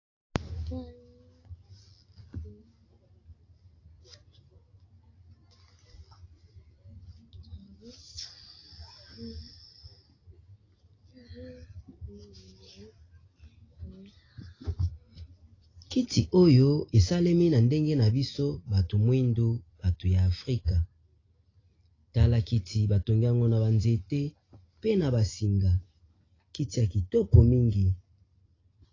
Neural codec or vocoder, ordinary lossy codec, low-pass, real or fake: none; AAC, 32 kbps; 7.2 kHz; real